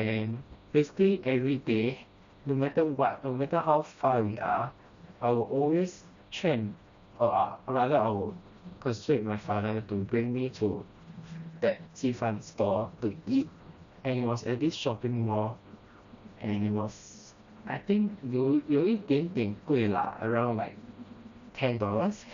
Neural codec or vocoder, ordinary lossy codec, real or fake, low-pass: codec, 16 kHz, 1 kbps, FreqCodec, smaller model; Opus, 64 kbps; fake; 7.2 kHz